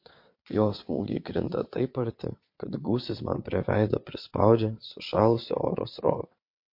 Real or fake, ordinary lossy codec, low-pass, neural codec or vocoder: fake; MP3, 32 kbps; 5.4 kHz; vocoder, 22.05 kHz, 80 mel bands, WaveNeXt